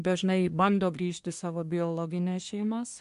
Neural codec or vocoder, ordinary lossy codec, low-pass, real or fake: codec, 24 kHz, 1 kbps, SNAC; MP3, 64 kbps; 10.8 kHz; fake